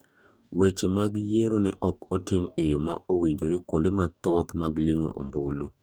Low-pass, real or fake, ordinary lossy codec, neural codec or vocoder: none; fake; none; codec, 44.1 kHz, 2.6 kbps, DAC